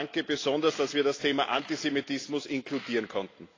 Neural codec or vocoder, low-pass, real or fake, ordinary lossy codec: none; 7.2 kHz; real; AAC, 32 kbps